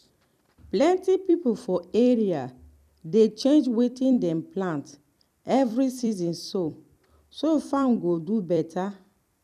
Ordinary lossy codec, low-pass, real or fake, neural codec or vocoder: none; 14.4 kHz; fake; vocoder, 44.1 kHz, 128 mel bands every 256 samples, BigVGAN v2